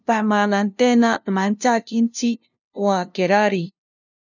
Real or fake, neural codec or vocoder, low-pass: fake; codec, 16 kHz, 0.5 kbps, FunCodec, trained on LibriTTS, 25 frames a second; 7.2 kHz